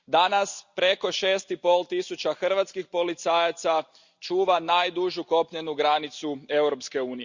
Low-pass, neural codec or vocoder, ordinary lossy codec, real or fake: 7.2 kHz; none; Opus, 64 kbps; real